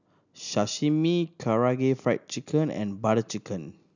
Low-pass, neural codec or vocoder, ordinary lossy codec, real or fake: 7.2 kHz; none; none; real